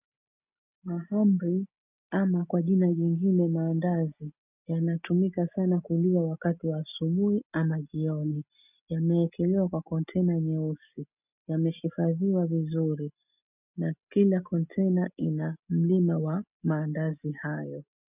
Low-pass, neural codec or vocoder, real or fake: 3.6 kHz; none; real